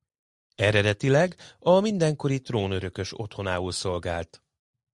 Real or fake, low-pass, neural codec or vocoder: real; 10.8 kHz; none